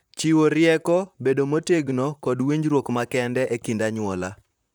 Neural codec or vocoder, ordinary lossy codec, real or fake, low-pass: vocoder, 44.1 kHz, 128 mel bands, Pupu-Vocoder; none; fake; none